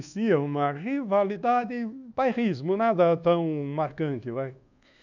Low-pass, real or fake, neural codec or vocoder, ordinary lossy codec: 7.2 kHz; fake; codec, 24 kHz, 1.2 kbps, DualCodec; none